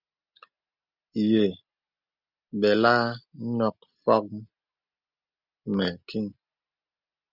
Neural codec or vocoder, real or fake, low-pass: none; real; 5.4 kHz